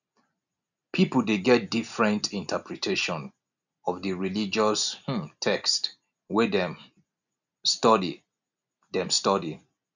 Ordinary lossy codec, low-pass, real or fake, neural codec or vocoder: none; 7.2 kHz; real; none